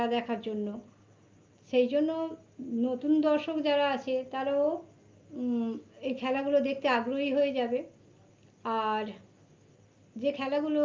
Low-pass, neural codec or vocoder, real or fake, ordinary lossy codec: 7.2 kHz; none; real; Opus, 24 kbps